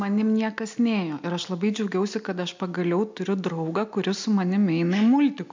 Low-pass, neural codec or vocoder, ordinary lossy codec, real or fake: 7.2 kHz; none; MP3, 64 kbps; real